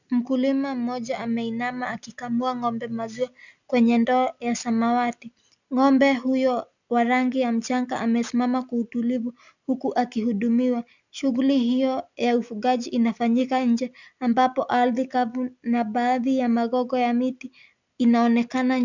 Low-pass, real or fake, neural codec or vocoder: 7.2 kHz; real; none